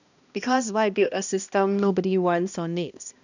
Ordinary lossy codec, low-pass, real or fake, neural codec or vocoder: none; 7.2 kHz; fake; codec, 16 kHz, 1 kbps, X-Codec, HuBERT features, trained on balanced general audio